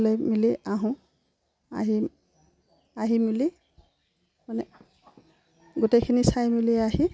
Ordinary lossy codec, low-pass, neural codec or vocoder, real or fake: none; none; none; real